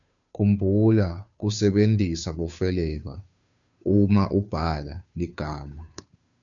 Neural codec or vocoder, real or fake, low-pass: codec, 16 kHz, 2 kbps, FunCodec, trained on Chinese and English, 25 frames a second; fake; 7.2 kHz